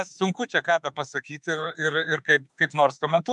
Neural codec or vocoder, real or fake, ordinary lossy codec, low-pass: autoencoder, 48 kHz, 32 numbers a frame, DAC-VAE, trained on Japanese speech; fake; MP3, 96 kbps; 10.8 kHz